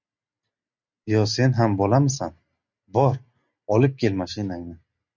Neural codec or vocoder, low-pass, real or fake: none; 7.2 kHz; real